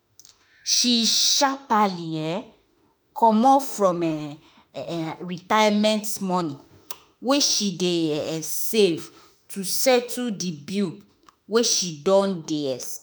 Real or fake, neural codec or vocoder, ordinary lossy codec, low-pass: fake; autoencoder, 48 kHz, 32 numbers a frame, DAC-VAE, trained on Japanese speech; none; none